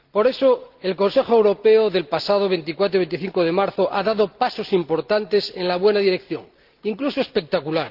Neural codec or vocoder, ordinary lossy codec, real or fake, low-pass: none; Opus, 32 kbps; real; 5.4 kHz